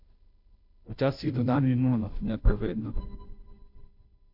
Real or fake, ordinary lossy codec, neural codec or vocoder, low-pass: fake; none; codec, 16 kHz, 0.5 kbps, FunCodec, trained on Chinese and English, 25 frames a second; 5.4 kHz